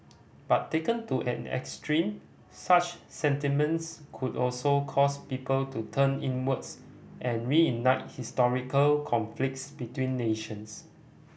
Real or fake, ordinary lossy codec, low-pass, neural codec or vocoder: real; none; none; none